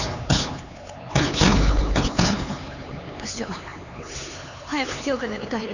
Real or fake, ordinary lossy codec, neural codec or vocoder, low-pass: fake; none; codec, 16 kHz, 4 kbps, X-Codec, HuBERT features, trained on LibriSpeech; 7.2 kHz